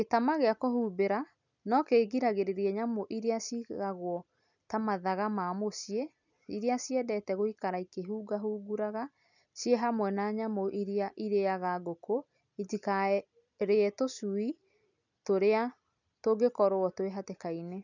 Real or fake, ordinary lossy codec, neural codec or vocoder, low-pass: real; none; none; 7.2 kHz